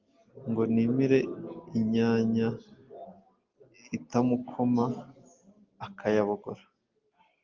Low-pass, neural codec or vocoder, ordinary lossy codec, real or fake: 7.2 kHz; none; Opus, 32 kbps; real